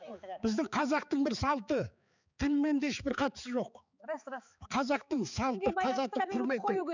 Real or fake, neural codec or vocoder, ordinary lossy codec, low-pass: fake; codec, 16 kHz, 4 kbps, X-Codec, HuBERT features, trained on balanced general audio; none; 7.2 kHz